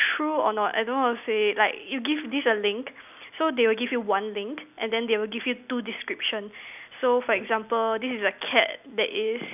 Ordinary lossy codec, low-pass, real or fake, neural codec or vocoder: none; 3.6 kHz; real; none